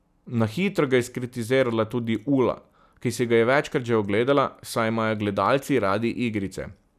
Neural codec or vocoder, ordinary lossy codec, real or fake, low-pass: none; none; real; 14.4 kHz